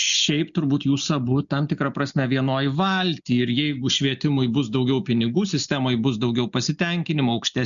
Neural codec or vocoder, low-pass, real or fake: none; 7.2 kHz; real